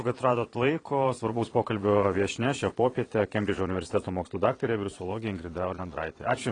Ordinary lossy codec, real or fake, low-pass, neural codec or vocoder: AAC, 32 kbps; fake; 9.9 kHz; vocoder, 22.05 kHz, 80 mel bands, Vocos